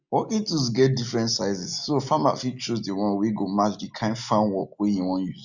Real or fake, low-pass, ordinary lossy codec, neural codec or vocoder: real; 7.2 kHz; none; none